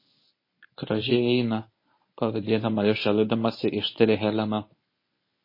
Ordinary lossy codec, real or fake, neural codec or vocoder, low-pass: MP3, 24 kbps; fake; codec, 24 kHz, 0.9 kbps, WavTokenizer, medium speech release version 1; 5.4 kHz